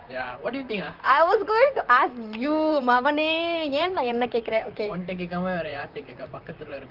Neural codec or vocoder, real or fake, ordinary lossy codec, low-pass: vocoder, 44.1 kHz, 128 mel bands, Pupu-Vocoder; fake; Opus, 32 kbps; 5.4 kHz